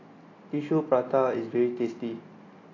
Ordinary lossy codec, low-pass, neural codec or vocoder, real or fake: AAC, 32 kbps; 7.2 kHz; none; real